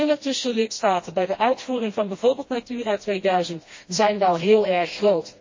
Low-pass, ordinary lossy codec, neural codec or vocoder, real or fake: 7.2 kHz; MP3, 32 kbps; codec, 16 kHz, 1 kbps, FreqCodec, smaller model; fake